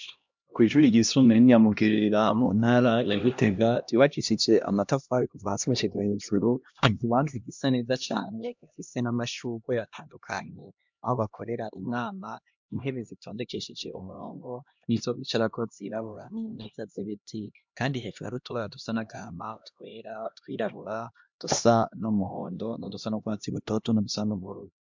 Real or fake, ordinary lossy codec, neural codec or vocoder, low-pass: fake; MP3, 64 kbps; codec, 16 kHz, 1 kbps, X-Codec, HuBERT features, trained on LibriSpeech; 7.2 kHz